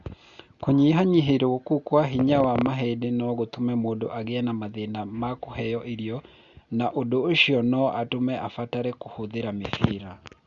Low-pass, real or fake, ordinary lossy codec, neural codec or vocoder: 7.2 kHz; real; Opus, 64 kbps; none